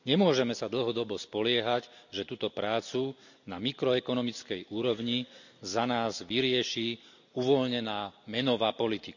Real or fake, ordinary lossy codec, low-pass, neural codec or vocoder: real; none; 7.2 kHz; none